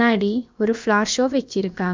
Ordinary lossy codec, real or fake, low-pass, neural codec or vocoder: MP3, 64 kbps; fake; 7.2 kHz; codec, 16 kHz, about 1 kbps, DyCAST, with the encoder's durations